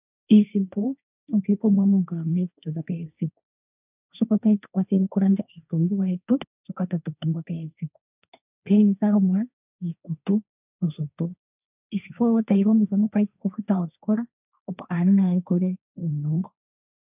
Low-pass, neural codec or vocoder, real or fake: 3.6 kHz; codec, 16 kHz, 1.1 kbps, Voila-Tokenizer; fake